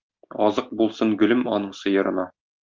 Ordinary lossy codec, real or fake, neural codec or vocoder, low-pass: Opus, 32 kbps; real; none; 7.2 kHz